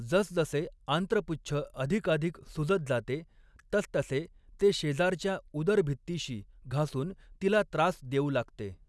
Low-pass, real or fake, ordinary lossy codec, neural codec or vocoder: none; real; none; none